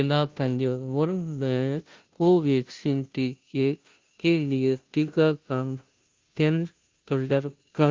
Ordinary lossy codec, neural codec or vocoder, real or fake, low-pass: Opus, 32 kbps; codec, 16 kHz, 0.5 kbps, FunCodec, trained on Chinese and English, 25 frames a second; fake; 7.2 kHz